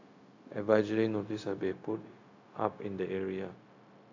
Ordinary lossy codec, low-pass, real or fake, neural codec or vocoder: AAC, 48 kbps; 7.2 kHz; fake; codec, 16 kHz, 0.4 kbps, LongCat-Audio-Codec